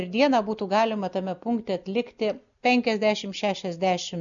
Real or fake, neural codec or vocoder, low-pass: real; none; 7.2 kHz